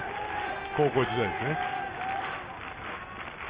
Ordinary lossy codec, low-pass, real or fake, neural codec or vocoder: Opus, 32 kbps; 3.6 kHz; real; none